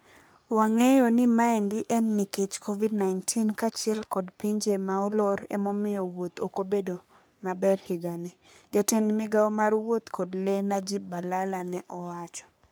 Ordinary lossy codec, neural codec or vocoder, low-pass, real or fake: none; codec, 44.1 kHz, 3.4 kbps, Pupu-Codec; none; fake